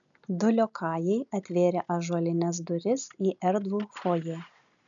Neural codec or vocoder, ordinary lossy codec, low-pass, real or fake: none; MP3, 96 kbps; 7.2 kHz; real